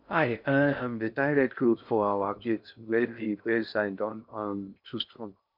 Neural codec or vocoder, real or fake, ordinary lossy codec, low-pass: codec, 16 kHz in and 24 kHz out, 0.6 kbps, FocalCodec, streaming, 2048 codes; fake; none; 5.4 kHz